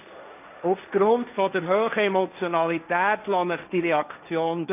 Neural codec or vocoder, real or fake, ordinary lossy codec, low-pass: codec, 16 kHz, 1.1 kbps, Voila-Tokenizer; fake; none; 3.6 kHz